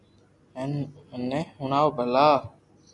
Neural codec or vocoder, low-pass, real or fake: none; 10.8 kHz; real